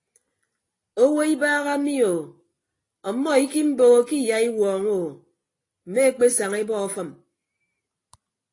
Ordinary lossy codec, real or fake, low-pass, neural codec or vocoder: AAC, 32 kbps; real; 10.8 kHz; none